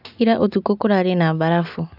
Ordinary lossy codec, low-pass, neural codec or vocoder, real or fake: AAC, 48 kbps; 5.4 kHz; none; real